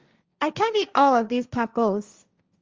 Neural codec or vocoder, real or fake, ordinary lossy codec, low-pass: codec, 16 kHz, 1.1 kbps, Voila-Tokenizer; fake; Opus, 32 kbps; 7.2 kHz